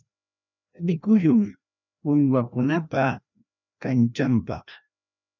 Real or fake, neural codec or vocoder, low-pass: fake; codec, 16 kHz, 1 kbps, FreqCodec, larger model; 7.2 kHz